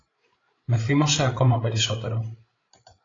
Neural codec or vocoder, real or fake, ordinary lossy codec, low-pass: codec, 16 kHz, 8 kbps, FreqCodec, larger model; fake; AAC, 32 kbps; 7.2 kHz